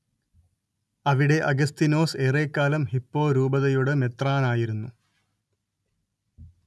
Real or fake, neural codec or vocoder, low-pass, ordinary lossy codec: real; none; none; none